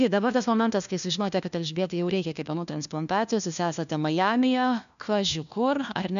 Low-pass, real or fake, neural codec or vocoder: 7.2 kHz; fake; codec, 16 kHz, 1 kbps, FunCodec, trained on LibriTTS, 50 frames a second